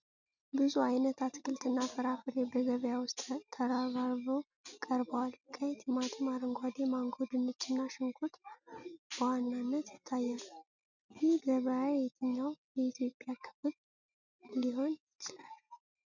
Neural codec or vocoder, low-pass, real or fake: none; 7.2 kHz; real